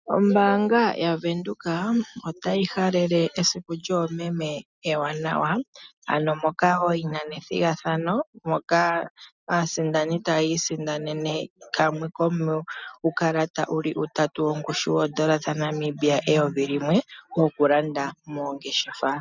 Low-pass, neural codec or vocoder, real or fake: 7.2 kHz; none; real